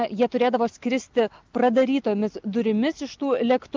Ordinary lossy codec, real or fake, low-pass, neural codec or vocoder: Opus, 24 kbps; real; 7.2 kHz; none